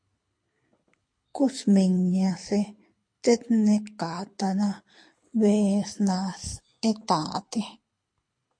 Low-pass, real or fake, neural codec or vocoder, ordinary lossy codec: 9.9 kHz; fake; codec, 24 kHz, 6 kbps, HILCodec; MP3, 48 kbps